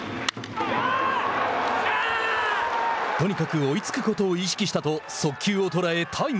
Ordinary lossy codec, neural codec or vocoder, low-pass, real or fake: none; none; none; real